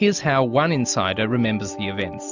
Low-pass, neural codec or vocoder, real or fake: 7.2 kHz; none; real